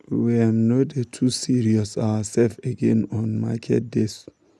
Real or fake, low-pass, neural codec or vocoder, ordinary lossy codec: real; none; none; none